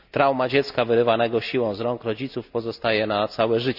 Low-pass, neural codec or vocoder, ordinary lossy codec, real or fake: 5.4 kHz; none; none; real